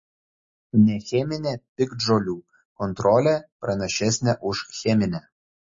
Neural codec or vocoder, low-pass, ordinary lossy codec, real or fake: none; 7.2 kHz; MP3, 32 kbps; real